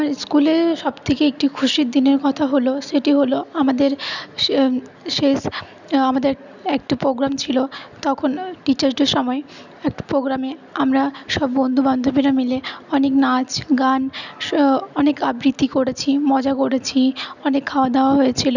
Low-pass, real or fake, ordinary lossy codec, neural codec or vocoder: 7.2 kHz; real; none; none